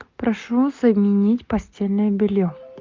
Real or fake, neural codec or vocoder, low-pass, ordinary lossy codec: real; none; 7.2 kHz; Opus, 24 kbps